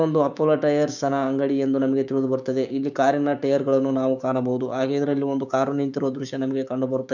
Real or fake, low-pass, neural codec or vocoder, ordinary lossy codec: fake; 7.2 kHz; codec, 16 kHz, 6 kbps, DAC; none